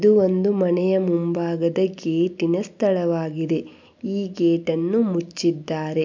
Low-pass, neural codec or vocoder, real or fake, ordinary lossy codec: 7.2 kHz; none; real; MP3, 64 kbps